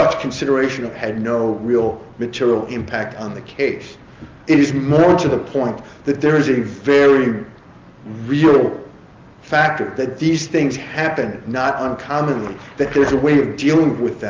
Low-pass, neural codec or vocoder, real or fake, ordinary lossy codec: 7.2 kHz; none; real; Opus, 32 kbps